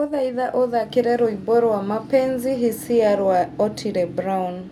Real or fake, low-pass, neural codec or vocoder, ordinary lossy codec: real; 19.8 kHz; none; none